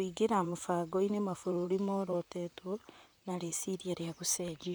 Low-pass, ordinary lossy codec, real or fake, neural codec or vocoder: none; none; fake; vocoder, 44.1 kHz, 128 mel bands, Pupu-Vocoder